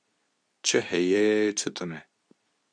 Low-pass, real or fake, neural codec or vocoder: 9.9 kHz; fake; codec, 24 kHz, 0.9 kbps, WavTokenizer, medium speech release version 2